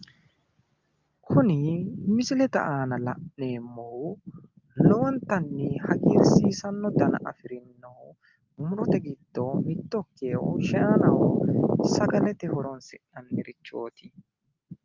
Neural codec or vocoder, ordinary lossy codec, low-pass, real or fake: none; Opus, 32 kbps; 7.2 kHz; real